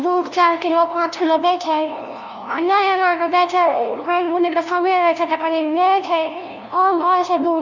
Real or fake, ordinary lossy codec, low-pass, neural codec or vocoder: fake; none; 7.2 kHz; codec, 16 kHz, 0.5 kbps, FunCodec, trained on LibriTTS, 25 frames a second